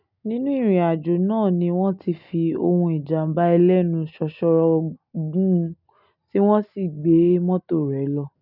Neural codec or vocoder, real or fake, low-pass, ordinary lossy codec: none; real; 5.4 kHz; none